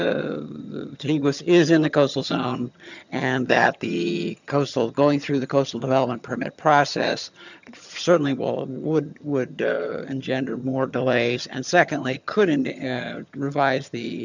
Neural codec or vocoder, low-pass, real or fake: vocoder, 22.05 kHz, 80 mel bands, HiFi-GAN; 7.2 kHz; fake